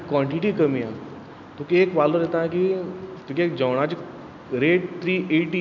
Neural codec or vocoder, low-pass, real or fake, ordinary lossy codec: none; 7.2 kHz; real; none